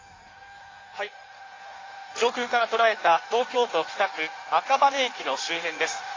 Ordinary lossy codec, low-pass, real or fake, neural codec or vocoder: AAC, 32 kbps; 7.2 kHz; fake; codec, 16 kHz in and 24 kHz out, 1.1 kbps, FireRedTTS-2 codec